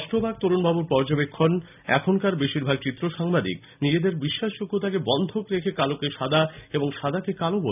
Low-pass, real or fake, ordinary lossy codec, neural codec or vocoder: 3.6 kHz; real; none; none